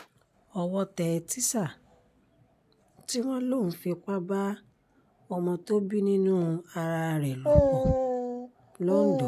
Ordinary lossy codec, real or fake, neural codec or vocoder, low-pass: MP3, 96 kbps; real; none; 14.4 kHz